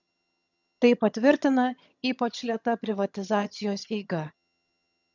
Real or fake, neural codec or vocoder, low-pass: fake; vocoder, 22.05 kHz, 80 mel bands, HiFi-GAN; 7.2 kHz